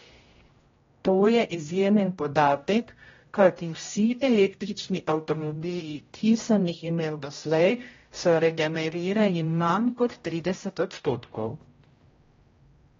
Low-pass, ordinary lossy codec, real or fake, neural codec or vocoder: 7.2 kHz; AAC, 24 kbps; fake; codec, 16 kHz, 0.5 kbps, X-Codec, HuBERT features, trained on general audio